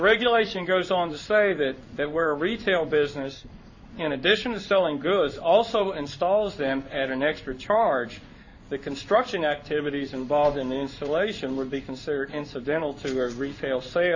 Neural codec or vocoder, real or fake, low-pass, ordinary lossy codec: codec, 16 kHz in and 24 kHz out, 1 kbps, XY-Tokenizer; fake; 7.2 kHz; AAC, 48 kbps